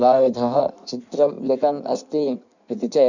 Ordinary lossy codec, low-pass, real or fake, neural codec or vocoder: none; 7.2 kHz; fake; codec, 16 kHz in and 24 kHz out, 1.1 kbps, FireRedTTS-2 codec